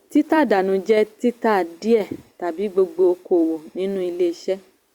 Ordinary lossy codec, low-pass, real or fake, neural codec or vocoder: Opus, 64 kbps; 19.8 kHz; fake; vocoder, 44.1 kHz, 128 mel bands every 256 samples, BigVGAN v2